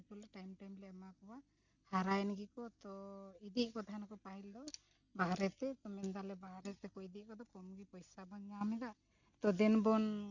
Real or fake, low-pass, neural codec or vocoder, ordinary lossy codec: real; 7.2 kHz; none; none